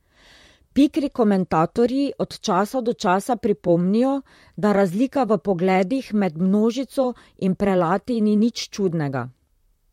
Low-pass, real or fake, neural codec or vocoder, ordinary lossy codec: 19.8 kHz; fake; vocoder, 44.1 kHz, 128 mel bands, Pupu-Vocoder; MP3, 64 kbps